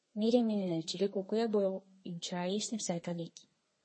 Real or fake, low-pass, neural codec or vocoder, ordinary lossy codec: fake; 9.9 kHz; codec, 32 kHz, 1.9 kbps, SNAC; MP3, 32 kbps